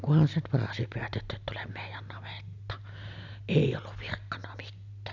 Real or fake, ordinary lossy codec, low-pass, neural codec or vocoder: real; none; 7.2 kHz; none